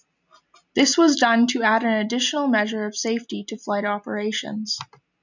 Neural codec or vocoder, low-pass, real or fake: none; 7.2 kHz; real